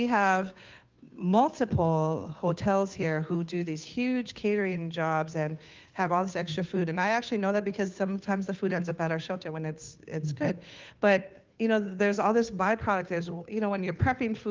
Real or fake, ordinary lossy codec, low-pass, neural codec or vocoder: fake; Opus, 24 kbps; 7.2 kHz; codec, 16 kHz, 2 kbps, FunCodec, trained on Chinese and English, 25 frames a second